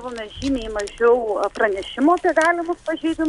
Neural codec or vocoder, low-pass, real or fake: none; 10.8 kHz; real